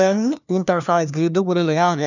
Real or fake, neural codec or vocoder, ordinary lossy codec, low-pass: fake; codec, 16 kHz, 1 kbps, FunCodec, trained on LibriTTS, 50 frames a second; none; 7.2 kHz